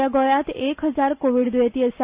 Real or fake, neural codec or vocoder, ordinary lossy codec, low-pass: real; none; Opus, 64 kbps; 3.6 kHz